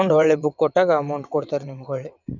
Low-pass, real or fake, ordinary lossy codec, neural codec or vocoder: 7.2 kHz; fake; none; vocoder, 22.05 kHz, 80 mel bands, WaveNeXt